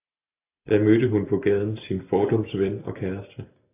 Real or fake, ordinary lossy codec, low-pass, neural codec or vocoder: real; AAC, 24 kbps; 3.6 kHz; none